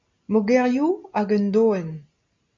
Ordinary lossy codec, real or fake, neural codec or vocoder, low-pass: MP3, 48 kbps; real; none; 7.2 kHz